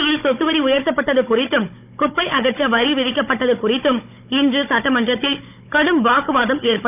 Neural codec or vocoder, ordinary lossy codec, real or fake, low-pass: codec, 16 kHz, 16 kbps, FunCodec, trained on Chinese and English, 50 frames a second; none; fake; 3.6 kHz